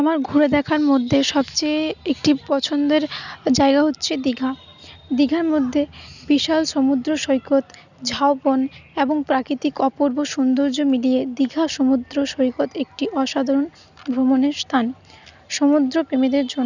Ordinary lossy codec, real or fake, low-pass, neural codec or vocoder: none; real; 7.2 kHz; none